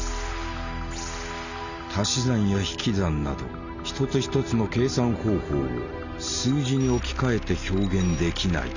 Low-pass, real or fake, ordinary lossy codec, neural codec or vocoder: 7.2 kHz; real; none; none